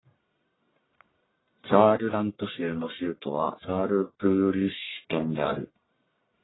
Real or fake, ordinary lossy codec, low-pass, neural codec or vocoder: fake; AAC, 16 kbps; 7.2 kHz; codec, 44.1 kHz, 1.7 kbps, Pupu-Codec